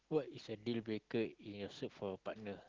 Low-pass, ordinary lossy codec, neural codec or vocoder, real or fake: 7.2 kHz; Opus, 16 kbps; none; real